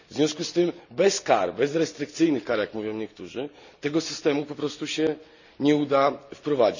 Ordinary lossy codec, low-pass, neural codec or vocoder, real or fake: none; 7.2 kHz; none; real